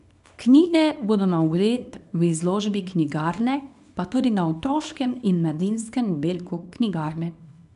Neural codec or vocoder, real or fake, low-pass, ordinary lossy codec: codec, 24 kHz, 0.9 kbps, WavTokenizer, small release; fake; 10.8 kHz; none